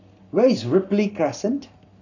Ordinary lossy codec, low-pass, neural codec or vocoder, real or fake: none; 7.2 kHz; codec, 44.1 kHz, 7.8 kbps, Pupu-Codec; fake